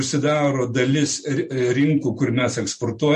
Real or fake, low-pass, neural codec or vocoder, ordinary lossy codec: real; 10.8 kHz; none; MP3, 48 kbps